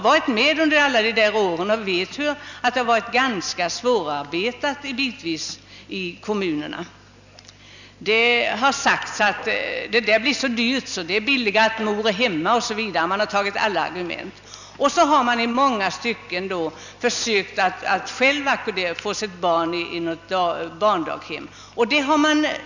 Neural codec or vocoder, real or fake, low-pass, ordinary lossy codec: none; real; 7.2 kHz; none